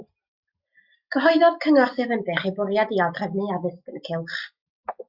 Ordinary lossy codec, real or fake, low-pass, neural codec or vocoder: Opus, 64 kbps; real; 5.4 kHz; none